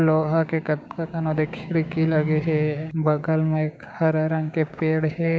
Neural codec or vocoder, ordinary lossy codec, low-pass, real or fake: codec, 16 kHz, 6 kbps, DAC; none; none; fake